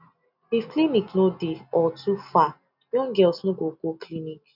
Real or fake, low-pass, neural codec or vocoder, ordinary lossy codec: real; 5.4 kHz; none; none